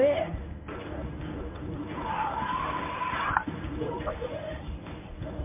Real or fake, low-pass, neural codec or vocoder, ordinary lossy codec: fake; 3.6 kHz; codec, 24 kHz, 0.9 kbps, WavTokenizer, medium speech release version 1; MP3, 32 kbps